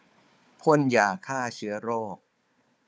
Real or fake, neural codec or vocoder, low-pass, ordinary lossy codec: fake; codec, 16 kHz, 4 kbps, FunCodec, trained on Chinese and English, 50 frames a second; none; none